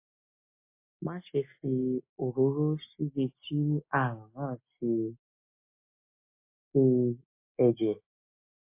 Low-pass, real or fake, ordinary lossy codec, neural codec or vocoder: 3.6 kHz; real; MP3, 24 kbps; none